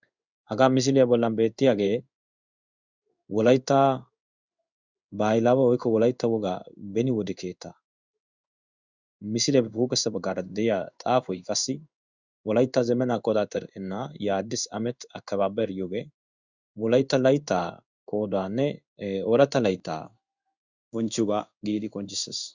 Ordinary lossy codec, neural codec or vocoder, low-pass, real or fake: Opus, 64 kbps; codec, 16 kHz in and 24 kHz out, 1 kbps, XY-Tokenizer; 7.2 kHz; fake